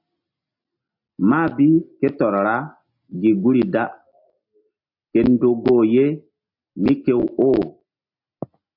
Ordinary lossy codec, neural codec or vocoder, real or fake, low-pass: MP3, 48 kbps; none; real; 5.4 kHz